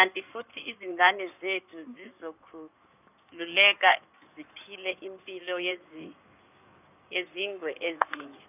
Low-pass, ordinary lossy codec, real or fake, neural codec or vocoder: 3.6 kHz; none; fake; codec, 16 kHz in and 24 kHz out, 2.2 kbps, FireRedTTS-2 codec